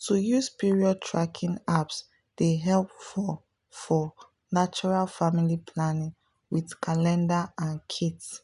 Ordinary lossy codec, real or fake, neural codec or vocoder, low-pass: none; real; none; 10.8 kHz